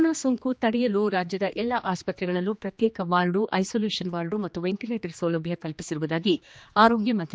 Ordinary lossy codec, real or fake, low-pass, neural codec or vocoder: none; fake; none; codec, 16 kHz, 2 kbps, X-Codec, HuBERT features, trained on general audio